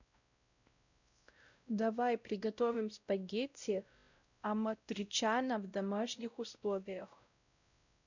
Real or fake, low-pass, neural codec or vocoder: fake; 7.2 kHz; codec, 16 kHz, 0.5 kbps, X-Codec, WavLM features, trained on Multilingual LibriSpeech